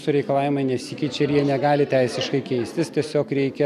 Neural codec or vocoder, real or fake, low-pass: none; real; 14.4 kHz